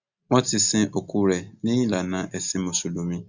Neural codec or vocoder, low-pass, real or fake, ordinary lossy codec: none; 7.2 kHz; real; Opus, 64 kbps